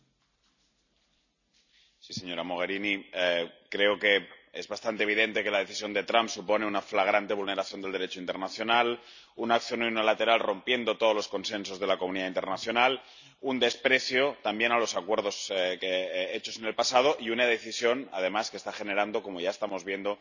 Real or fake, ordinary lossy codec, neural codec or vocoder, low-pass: real; none; none; 7.2 kHz